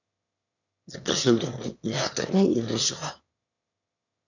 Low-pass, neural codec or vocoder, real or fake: 7.2 kHz; autoencoder, 22.05 kHz, a latent of 192 numbers a frame, VITS, trained on one speaker; fake